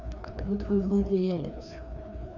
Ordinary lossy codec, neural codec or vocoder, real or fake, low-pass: none; codec, 16 kHz, 2 kbps, FreqCodec, larger model; fake; 7.2 kHz